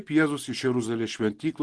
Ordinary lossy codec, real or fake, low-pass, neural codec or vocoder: Opus, 16 kbps; real; 10.8 kHz; none